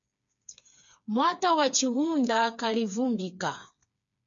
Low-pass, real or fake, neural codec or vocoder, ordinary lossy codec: 7.2 kHz; fake; codec, 16 kHz, 4 kbps, FreqCodec, smaller model; MP3, 64 kbps